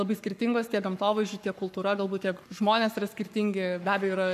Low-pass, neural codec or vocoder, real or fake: 14.4 kHz; codec, 44.1 kHz, 7.8 kbps, Pupu-Codec; fake